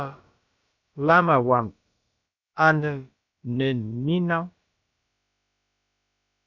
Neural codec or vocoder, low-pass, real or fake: codec, 16 kHz, about 1 kbps, DyCAST, with the encoder's durations; 7.2 kHz; fake